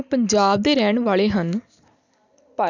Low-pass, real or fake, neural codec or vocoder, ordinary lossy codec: 7.2 kHz; real; none; none